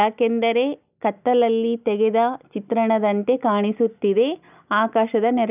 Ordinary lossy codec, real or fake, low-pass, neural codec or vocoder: none; real; 3.6 kHz; none